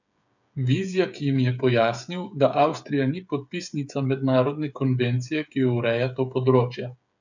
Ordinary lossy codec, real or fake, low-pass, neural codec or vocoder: none; fake; 7.2 kHz; codec, 16 kHz, 8 kbps, FreqCodec, smaller model